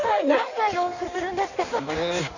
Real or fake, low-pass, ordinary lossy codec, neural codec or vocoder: fake; 7.2 kHz; none; codec, 16 kHz in and 24 kHz out, 1.1 kbps, FireRedTTS-2 codec